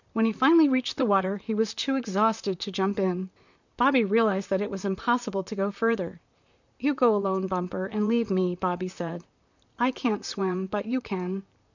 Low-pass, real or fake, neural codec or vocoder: 7.2 kHz; fake; vocoder, 44.1 kHz, 128 mel bands, Pupu-Vocoder